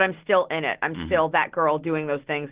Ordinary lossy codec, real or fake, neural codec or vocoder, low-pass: Opus, 16 kbps; real; none; 3.6 kHz